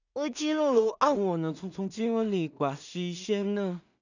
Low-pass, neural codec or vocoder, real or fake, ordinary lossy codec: 7.2 kHz; codec, 16 kHz in and 24 kHz out, 0.4 kbps, LongCat-Audio-Codec, two codebook decoder; fake; none